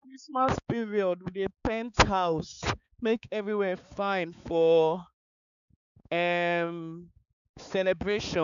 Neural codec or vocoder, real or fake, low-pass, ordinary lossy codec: codec, 16 kHz, 4 kbps, X-Codec, HuBERT features, trained on balanced general audio; fake; 7.2 kHz; none